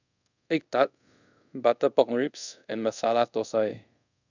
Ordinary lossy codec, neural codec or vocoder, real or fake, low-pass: none; codec, 24 kHz, 0.5 kbps, DualCodec; fake; 7.2 kHz